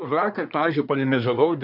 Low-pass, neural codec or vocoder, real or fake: 5.4 kHz; codec, 24 kHz, 1 kbps, SNAC; fake